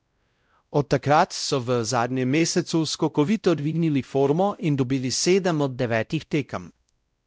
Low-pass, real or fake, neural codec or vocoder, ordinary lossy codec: none; fake; codec, 16 kHz, 0.5 kbps, X-Codec, WavLM features, trained on Multilingual LibriSpeech; none